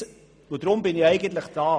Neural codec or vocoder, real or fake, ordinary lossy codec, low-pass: none; real; none; none